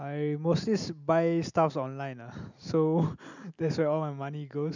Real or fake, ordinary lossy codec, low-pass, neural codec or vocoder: real; none; 7.2 kHz; none